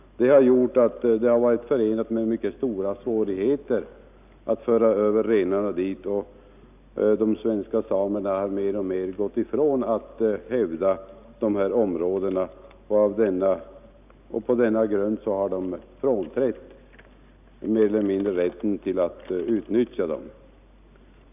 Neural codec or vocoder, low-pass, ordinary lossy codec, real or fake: none; 3.6 kHz; none; real